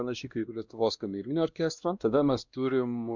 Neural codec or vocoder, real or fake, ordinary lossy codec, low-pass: codec, 16 kHz, 1 kbps, X-Codec, WavLM features, trained on Multilingual LibriSpeech; fake; Opus, 64 kbps; 7.2 kHz